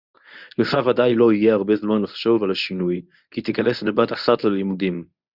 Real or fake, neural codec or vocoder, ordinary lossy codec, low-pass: fake; codec, 24 kHz, 0.9 kbps, WavTokenizer, medium speech release version 1; Opus, 64 kbps; 5.4 kHz